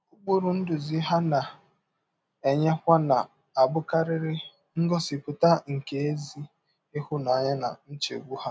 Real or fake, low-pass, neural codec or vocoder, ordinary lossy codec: real; none; none; none